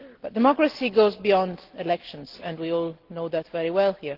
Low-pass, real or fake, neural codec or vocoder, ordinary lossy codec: 5.4 kHz; real; none; Opus, 16 kbps